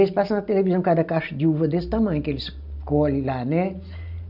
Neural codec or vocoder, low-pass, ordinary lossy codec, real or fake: none; 5.4 kHz; none; real